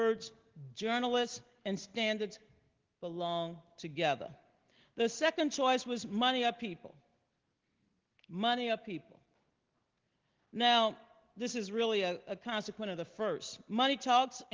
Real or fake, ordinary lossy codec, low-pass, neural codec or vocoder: real; Opus, 16 kbps; 7.2 kHz; none